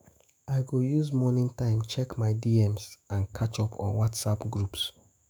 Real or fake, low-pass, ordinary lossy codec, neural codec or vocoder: fake; none; none; autoencoder, 48 kHz, 128 numbers a frame, DAC-VAE, trained on Japanese speech